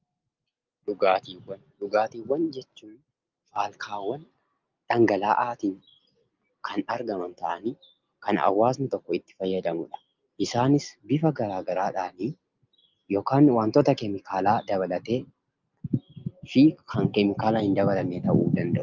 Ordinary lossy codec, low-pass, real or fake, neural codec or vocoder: Opus, 32 kbps; 7.2 kHz; real; none